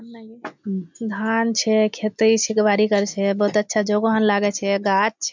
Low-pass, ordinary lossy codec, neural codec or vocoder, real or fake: 7.2 kHz; MP3, 64 kbps; none; real